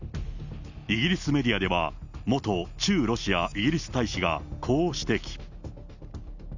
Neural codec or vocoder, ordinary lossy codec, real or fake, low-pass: none; none; real; 7.2 kHz